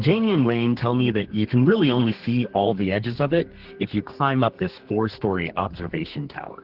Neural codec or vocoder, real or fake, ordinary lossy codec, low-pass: codec, 32 kHz, 1.9 kbps, SNAC; fake; Opus, 16 kbps; 5.4 kHz